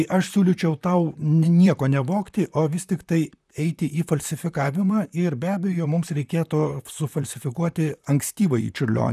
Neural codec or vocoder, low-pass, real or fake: vocoder, 44.1 kHz, 128 mel bands, Pupu-Vocoder; 14.4 kHz; fake